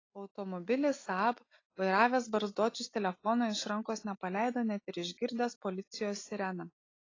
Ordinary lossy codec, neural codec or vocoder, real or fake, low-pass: AAC, 32 kbps; none; real; 7.2 kHz